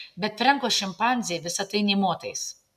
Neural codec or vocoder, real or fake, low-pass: none; real; 14.4 kHz